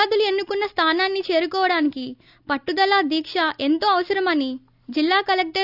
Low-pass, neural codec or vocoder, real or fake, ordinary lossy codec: 5.4 kHz; none; real; none